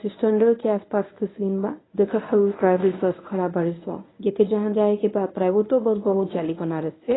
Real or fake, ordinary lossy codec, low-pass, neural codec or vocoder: fake; AAC, 16 kbps; 7.2 kHz; codec, 24 kHz, 0.9 kbps, WavTokenizer, medium speech release version 1